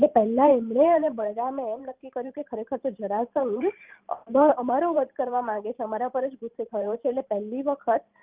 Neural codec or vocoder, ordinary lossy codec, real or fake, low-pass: vocoder, 44.1 kHz, 128 mel bands every 512 samples, BigVGAN v2; Opus, 24 kbps; fake; 3.6 kHz